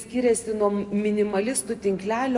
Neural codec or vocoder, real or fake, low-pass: none; real; 10.8 kHz